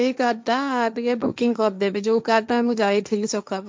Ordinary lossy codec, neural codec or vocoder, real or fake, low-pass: none; codec, 16 kHz, 1.1 kbps, Voila-Tokenizer; fake; none